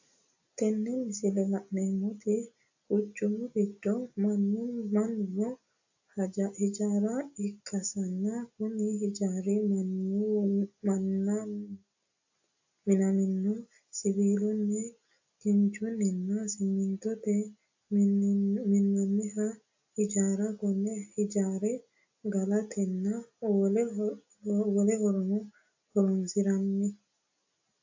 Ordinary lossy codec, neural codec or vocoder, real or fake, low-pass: AAC, 48 kbps; none; real; 7.2 kHz